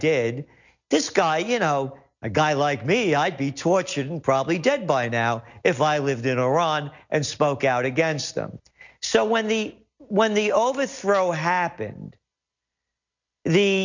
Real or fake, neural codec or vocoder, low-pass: real; none; 7.2 kHz